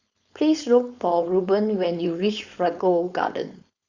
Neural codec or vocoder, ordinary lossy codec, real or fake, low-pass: codec, 16 kHz, 4.8 kbps, FACodec; Opus, 64 kbps; fake; 7.2 kHz